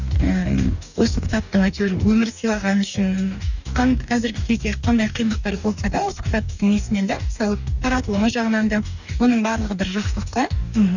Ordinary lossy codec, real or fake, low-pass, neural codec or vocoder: none; fake; 7.2 kHz; codec, 44.1 kHz, 2.6 kbps, DAC